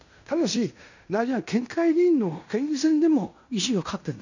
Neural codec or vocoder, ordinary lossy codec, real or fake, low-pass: codec, 16 kHz in and 24 kHz out, 0.9 kbps, LongCat-Audio-Codec, fine tuned four codebook decoder; none; fake; 7.2 kHz